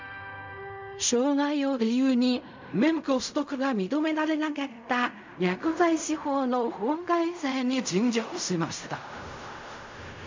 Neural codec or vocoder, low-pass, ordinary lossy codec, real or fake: codec, 16 kHz in and 24 kHz out, 0.4 kbps, LongCat-Audio-Codec, fine tuned four codebook decoder; 7.2 kHz; MP3, 64 kbps; fake